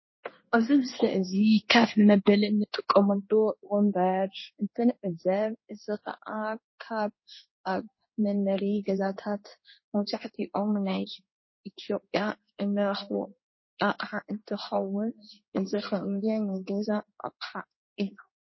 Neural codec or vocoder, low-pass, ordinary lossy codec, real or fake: codec, 16 kHz in and 24 kHz out, 1 kbps, XY-Tokenizer; 7.2 kHz; MP3, 24 kbps; fake